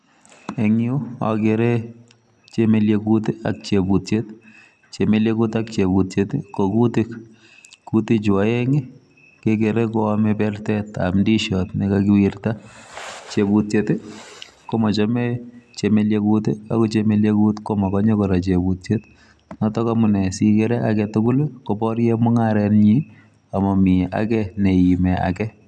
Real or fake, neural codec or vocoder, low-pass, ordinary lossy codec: real; none; none; none